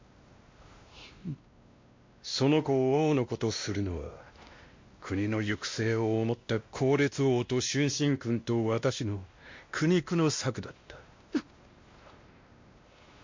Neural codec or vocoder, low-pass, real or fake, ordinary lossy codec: codec, 16 kHz, 1 kbps, X-Codec, WavLM features, trained on Multilingual LibriSpeech; 7.2 kHz; fake; MP3, 48 kbps